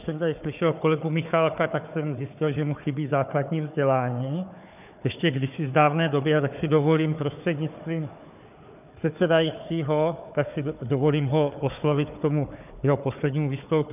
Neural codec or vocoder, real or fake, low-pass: codec, 16 kHz, 4 kbps, FunCodec, trained on Chinese and English, 50 frames a second; fake; 3.6 kHz